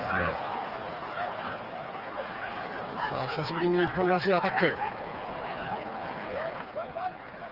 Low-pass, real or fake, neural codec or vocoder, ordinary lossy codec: 5.4 kHz; fake; codec, 16 kHz, 4 kbps, FreqCodec, smaller model; Opus, 24 kbps